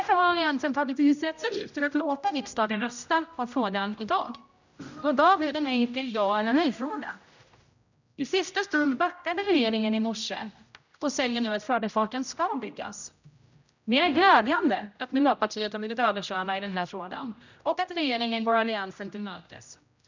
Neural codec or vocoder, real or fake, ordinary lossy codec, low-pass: codec, 16 kHz, 0.5 kbps, X-Codec, HuBERT features, trained on general audio; fake; none; 7.2 kHz